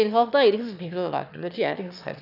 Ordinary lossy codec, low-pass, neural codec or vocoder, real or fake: none; 5.4 kHz; autoencoder, 22.05 kHz, a latent of 192 numbers a frame, VITS, trained on one speaker; fake